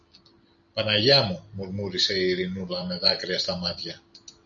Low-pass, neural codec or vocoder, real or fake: 7.2 kHz; none; real